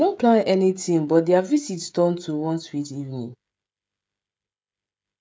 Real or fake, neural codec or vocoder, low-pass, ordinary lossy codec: fake; codec, 16 kHz, 16 kbps, FreqCodec, smaller model; none; none